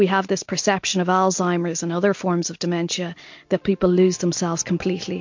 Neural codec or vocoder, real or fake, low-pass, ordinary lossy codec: none; real; 7.2 kHz; MP3, 48 kbps